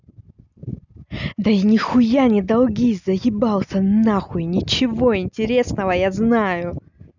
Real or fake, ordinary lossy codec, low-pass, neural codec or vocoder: real; none; 7.2 kHz; none